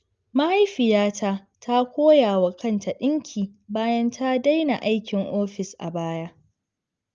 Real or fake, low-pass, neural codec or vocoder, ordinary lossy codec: real; 7.2 kHz; none; Opus, 24 kbps